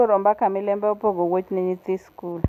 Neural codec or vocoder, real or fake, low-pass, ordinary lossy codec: none; real; 14.4 kHz; none